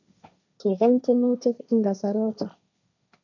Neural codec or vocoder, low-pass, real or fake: codec, 16 kHz, 1.1 kbps, Voila-Tokenizer; 7.2 kHz; fake